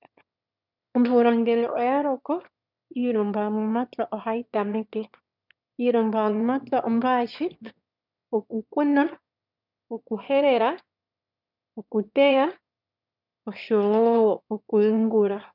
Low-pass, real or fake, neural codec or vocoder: 5.4 kHz; fake; autoencoder, 22.05 kHz, a latent of 192 numbers a frame, VITS, trained on one speaker